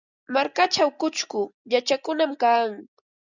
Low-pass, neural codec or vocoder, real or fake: 7.2 kHz; none; real